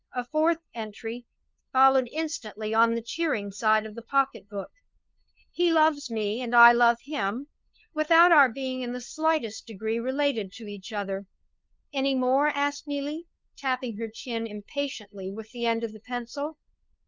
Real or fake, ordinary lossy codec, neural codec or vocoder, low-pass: fake; Opus, 24 kbps; codec, 16 kHz, 2 kbps, FunCodec, trained on LibriTTS, 25 frames a second; 7.2 kHz